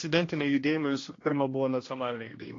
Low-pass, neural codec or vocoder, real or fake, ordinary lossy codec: 7.2 kHz; codec, 16 kHz, 1 kbps, X-Codec, HuBERT features, trained on general audio; fake; AAC, 32 kbps